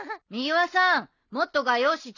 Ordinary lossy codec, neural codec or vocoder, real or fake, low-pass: none; none; real; 7.2 kHz